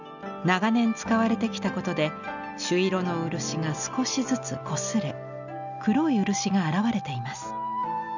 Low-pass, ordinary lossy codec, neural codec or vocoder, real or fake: 7.2 kHz; none; none; real